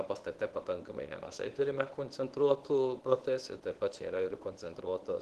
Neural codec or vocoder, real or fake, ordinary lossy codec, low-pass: codec, 24 kHz, 0.9 kbps, WavTokenizer, medium speech release version 1; fake; Opus, 16 kbps; 10.8 kHz